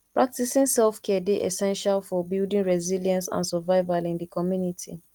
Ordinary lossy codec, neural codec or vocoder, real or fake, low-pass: Opus, 32 kbps; none; real; 19.8 kHz